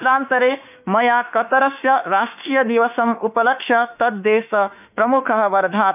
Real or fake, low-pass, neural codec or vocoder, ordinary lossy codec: fake; 3.6 kHz; autoencoder, 48 kHz, 32 numbers a frame, DAC-VAE, trained on Japanese speech; none